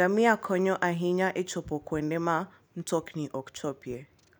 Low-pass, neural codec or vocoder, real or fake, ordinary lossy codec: none; none; real; none